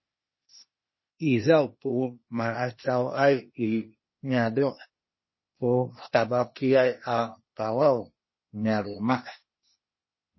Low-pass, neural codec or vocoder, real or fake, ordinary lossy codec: 7.2 kHz; codec, 16 kHz, 0.8 kbps, ZipCodec; fake; MP3, 24 kbps